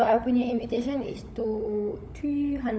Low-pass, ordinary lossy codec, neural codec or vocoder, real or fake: none; none; codec, 16 kHz, 16 kbps, FunCodec, trained on LibriTTS, 50 frames a second; fake